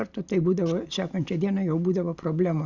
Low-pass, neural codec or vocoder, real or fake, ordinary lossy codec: 7.2 kHz; none; real; Opus, 64 kbps